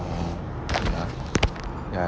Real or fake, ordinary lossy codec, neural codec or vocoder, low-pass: real; none; none; none